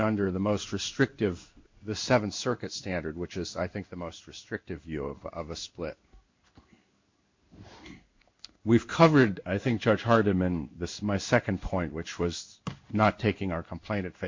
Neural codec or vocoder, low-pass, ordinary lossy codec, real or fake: codec, 16 kHz in and 24 kHz out, 1 kbps, XY-Tokenizer; 7.2 kHz; MP3, 64 kbps; fake